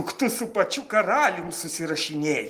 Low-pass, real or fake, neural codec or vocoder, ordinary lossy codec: 14.4 kHz; real; none; Opus, 24 kbps